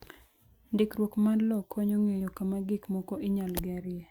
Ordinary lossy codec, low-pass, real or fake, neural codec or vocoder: Opus, 64 kbps; 19.8 kHz; real; none